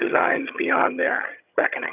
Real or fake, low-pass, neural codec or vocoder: fake; 3.6 kHz; vocoder, 22.05 kHz, 80 mel bands, HiFi-GAN